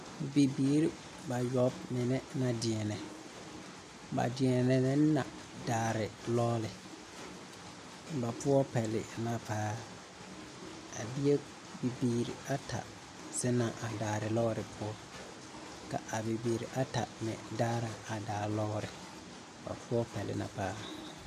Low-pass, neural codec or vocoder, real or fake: 14.4 kHz; none; real